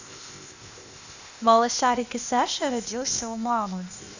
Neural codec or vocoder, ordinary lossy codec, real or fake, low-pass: codec, 16 kHz, 0.8 kbps, ZipCodec; none; fake; 7.2 kHz